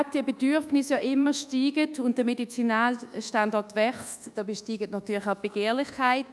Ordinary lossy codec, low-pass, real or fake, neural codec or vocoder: none; none; fake; codec, 24 kHz, 1.2 kbps, DualCodec